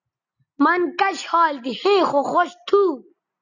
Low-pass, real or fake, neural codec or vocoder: 7.2 kHz; real; none